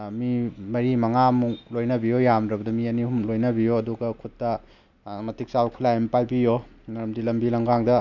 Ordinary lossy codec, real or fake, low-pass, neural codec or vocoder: none; real; 7.2 kHz; none